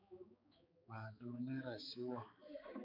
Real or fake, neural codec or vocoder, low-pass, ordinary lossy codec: fake; codec, 16 kHz, 4 kbps, X-Codec, HuBERT features, trained on balanced general audio; 5.4 kHz; MP3, 48 kbps